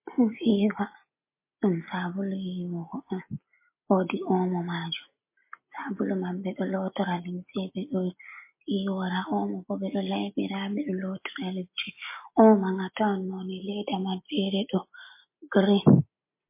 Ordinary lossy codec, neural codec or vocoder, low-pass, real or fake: MP3, 24 kbps; none; 3.6 kHz; real